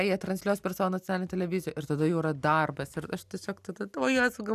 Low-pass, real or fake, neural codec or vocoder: 14.4 kHz; real; none